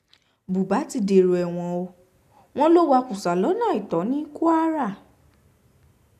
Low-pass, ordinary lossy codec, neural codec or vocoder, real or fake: 14.4 kHz; none; none; real